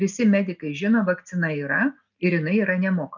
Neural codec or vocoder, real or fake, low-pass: none; real; 7.2 kHz